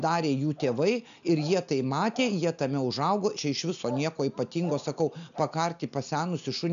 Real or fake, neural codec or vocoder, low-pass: real; none; 7.2 kHz